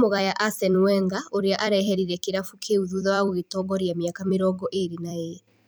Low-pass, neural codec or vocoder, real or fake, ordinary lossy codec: none; vocoder, 44.1 kHz, 128 mel bands every 256 samples, BigVGAN v2; fake; none